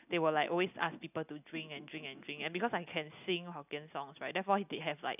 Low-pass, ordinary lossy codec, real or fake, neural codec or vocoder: 3.6 kHz; none; real; none